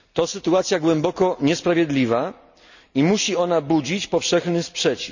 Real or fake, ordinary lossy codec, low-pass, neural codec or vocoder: real; none; 7.2 kHz; none